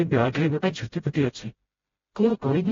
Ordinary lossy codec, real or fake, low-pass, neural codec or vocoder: AAC, 24 kbps; fake; 7.2 kHz; codec, 16 kHz, 0.5 kbps, FreqCodec, smaller model